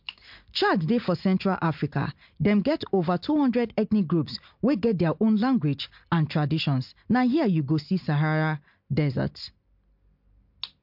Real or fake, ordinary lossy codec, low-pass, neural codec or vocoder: real; MP3, 48 kbps; 5.4 kHz; none